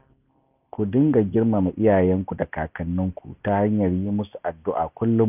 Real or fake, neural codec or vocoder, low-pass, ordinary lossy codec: real; none; 3.6 kHz; none